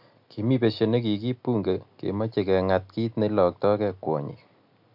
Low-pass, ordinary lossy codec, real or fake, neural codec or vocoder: 5.4 kHz; MP3, 48 kbps; real; none